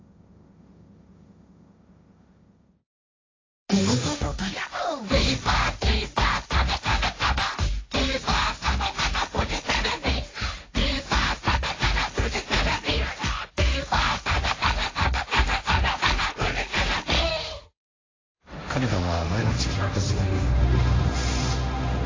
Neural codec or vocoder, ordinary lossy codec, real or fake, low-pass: codec, 16 kHz, 1.1 kbps, Voila-Tokenizer; AAC, 32 kbps; fake; 7.2 kHz